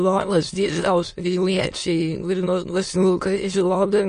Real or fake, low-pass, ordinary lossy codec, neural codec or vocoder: fake; 9.9 kHz; MP3, 48 kbps; autoencoder, 22.05 kHz, a latent of 192 numbers a frame, VITS, trained on many speakers